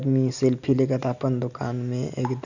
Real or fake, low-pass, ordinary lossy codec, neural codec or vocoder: real; 7.2 kHz; none; none